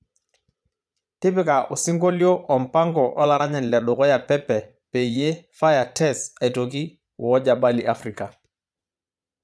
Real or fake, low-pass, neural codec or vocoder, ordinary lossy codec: fake; none; vocoder, 22.05 kHz, 80 mel bands, Vocos; none